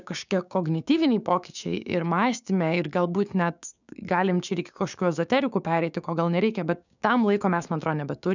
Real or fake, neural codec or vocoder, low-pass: fake; codec, 16 kHz, 6 kbps, DAC; 7.2 kHz